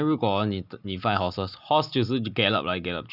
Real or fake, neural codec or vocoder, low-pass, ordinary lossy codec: real; none; 5.4 kHz; none